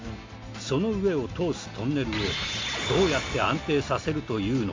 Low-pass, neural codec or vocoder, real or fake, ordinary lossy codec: 7.2 kHz; none; real; MP3, 48 kbps